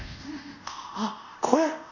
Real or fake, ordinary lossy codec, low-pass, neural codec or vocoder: fake; none; 7.2 kHz; codec, 24 kHz, 0.5 kbps, DualCodec